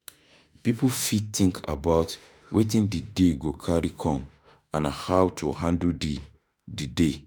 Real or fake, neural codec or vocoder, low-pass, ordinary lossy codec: fake; autoencoder, 48 kHz, 32 numbers a frame, DAC-VAE, trained on Japanese speech; none; none